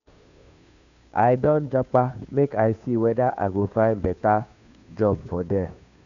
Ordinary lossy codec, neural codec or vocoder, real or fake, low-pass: none; codec, 16 kHz, 2 kbps, FunCodec, trained on Chinese and English, 25 frames a second; fake; 7.2 kHz